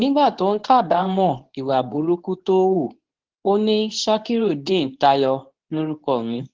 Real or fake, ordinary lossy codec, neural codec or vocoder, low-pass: fake; Opus, 16 kbps; codec, 24 kHz, 0.9 kbps, WavTokenizer, medium speech release version 2; 7.2 kHz